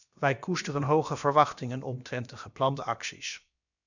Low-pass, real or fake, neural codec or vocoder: 7.2 kHz; fake; codec, 16 kHz, about 1 kbps, DyCAST, with the encoder's durations